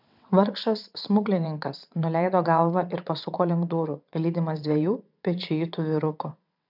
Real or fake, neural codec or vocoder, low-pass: fake; vocoder, 22.05 kHz, 80 mel bands, WaveNeXt; 5.4 kHz